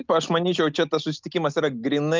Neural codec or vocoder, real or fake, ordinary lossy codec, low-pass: none; real; Opus, 24 kbps; 7.2 kHz